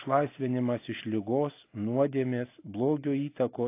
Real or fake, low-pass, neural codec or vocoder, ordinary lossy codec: real; 3.6 kHz; none; AAC, 24 kbps